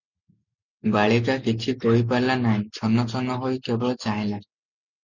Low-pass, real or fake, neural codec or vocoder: 7.2 kHz; real; none